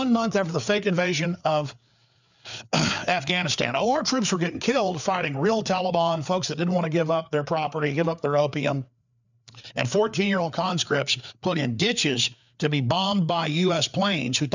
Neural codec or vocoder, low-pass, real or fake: codec, 16 kHz, 4 kbps, FreqCodec, larger model; 7.2 kHz; fake